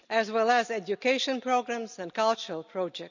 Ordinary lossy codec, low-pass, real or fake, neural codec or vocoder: none; 7.2 kHz; real; none